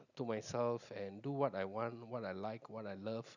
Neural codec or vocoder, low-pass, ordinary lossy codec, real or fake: none; 7.2 kHz; none; real